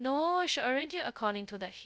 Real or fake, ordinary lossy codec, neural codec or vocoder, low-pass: fake; none; codec, 16 kHz, 0.2 kbps, FocalCodec; none